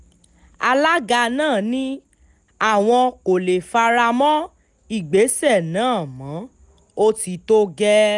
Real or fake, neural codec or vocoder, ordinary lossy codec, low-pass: real; none; none; 10.8 kHz